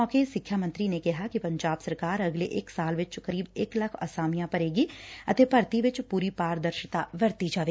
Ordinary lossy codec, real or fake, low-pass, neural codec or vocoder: none; real; none; none